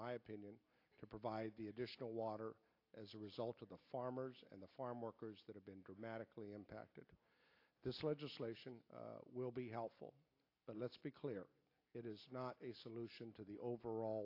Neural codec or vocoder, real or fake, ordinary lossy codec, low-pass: none; real; AAC, 32 kbps; 5.4 kHz